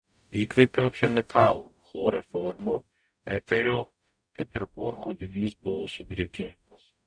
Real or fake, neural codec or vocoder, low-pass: fake; codec, 44.1 kHz, 0.9 kbps, DAC; 9.9 kHz